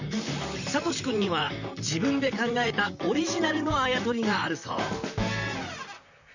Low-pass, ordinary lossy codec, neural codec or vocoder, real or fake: 7.2 kHz; none; vocoder, 44.1 kHz, 128 mel bands, Pupu-Vocoder; fake